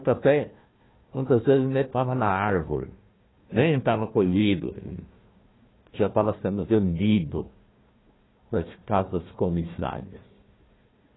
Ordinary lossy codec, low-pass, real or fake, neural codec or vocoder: AAC, 16 kbps; 7.2 kHz; fake; codec, 16 kHz, 1 kbps, FunCodec, trained on Chinese and English, 50 frames a second